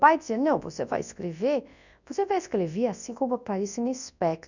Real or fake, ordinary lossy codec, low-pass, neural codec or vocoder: fake; none; 7.2 kHz; codec, 24 kHz, 0.9 kbps, WavTokenizer, large speech release